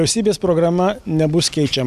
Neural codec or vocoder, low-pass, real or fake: none; 14.4 kHz; real